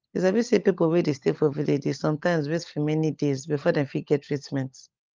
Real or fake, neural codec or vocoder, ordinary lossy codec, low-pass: fake; codec, 16 kHz, 16 kbps, FunCodec, trained on LibriTTS, 50 frames a second; Opus, 32 kbps; 7.2 kHz